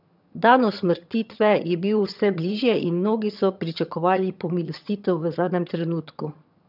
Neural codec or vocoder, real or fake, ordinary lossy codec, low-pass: vocoder, 22.05 kHz, 80 mel bands, HiFi-GAN; fake; none; 5.4 kHz